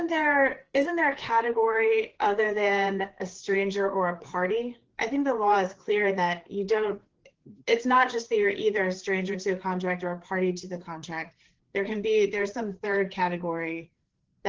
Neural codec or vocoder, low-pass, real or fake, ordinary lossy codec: codec, 16 kHz, 4 kbps, FreqCodec, larger model; 7.2 kHz; fake; Opus, 16 kbps